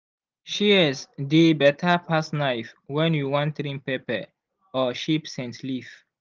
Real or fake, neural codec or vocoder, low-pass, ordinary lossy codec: real; none; 7.2 kHz; Opus, 32 kbps